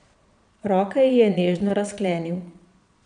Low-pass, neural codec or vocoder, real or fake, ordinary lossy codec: 9.9 kHz; vocoder, 22.05 kHz, 80 mel bands, Vocos; fake; none